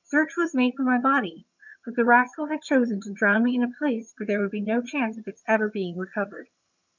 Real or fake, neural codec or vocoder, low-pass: fake; vocoder, 22.05 kHz, 80 mel bands, HiFi-GAN; 7.2 kHz